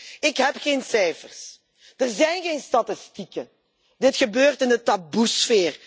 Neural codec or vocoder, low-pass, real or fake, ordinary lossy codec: none; none; real; none